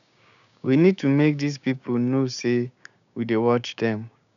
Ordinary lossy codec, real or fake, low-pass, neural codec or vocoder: none; fake; 7.2 kHz; codec, 16 kHz, 6 kbps, DAC